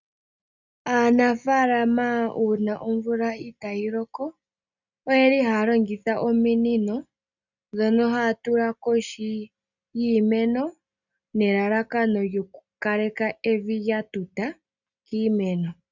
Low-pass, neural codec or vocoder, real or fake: 7.2 kHz; none; real